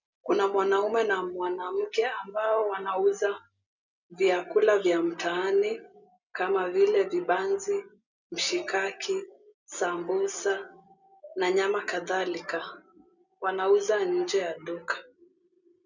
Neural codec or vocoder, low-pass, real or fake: none; 7.2 kHz; real